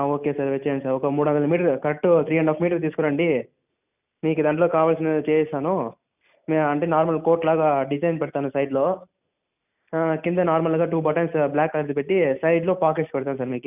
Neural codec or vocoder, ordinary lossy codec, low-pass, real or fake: none; none; 3.6 kHz; real